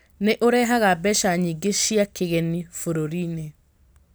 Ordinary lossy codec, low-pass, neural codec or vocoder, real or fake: none; none; none; real